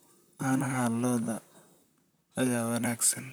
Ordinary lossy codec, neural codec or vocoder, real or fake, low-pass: none; vocoder, 44.1 kHz, 128 mel bands, Pupu-Vocoder; fake; none